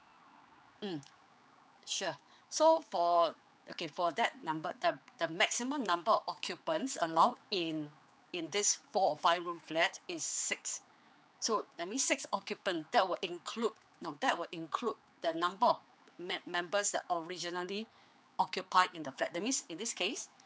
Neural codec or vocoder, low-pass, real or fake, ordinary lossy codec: codec, 16 kHz, 4 kbps, X-Codec, HuBERT features, trained on general audio; none; fake; none